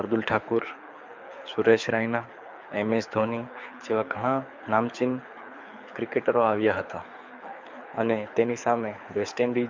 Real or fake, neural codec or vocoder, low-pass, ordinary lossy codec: fake; codec, 24 kHz, 6 kbps, HILCodec; 7.2 kHz; MP3, 64 kbps